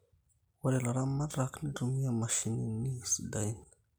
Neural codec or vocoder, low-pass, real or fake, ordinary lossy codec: none; none; real; none